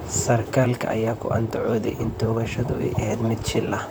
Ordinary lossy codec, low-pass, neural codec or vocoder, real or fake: none; none; vocoder, 44.1 kHz, 128 mel bands, Pupu-Vocoder; fake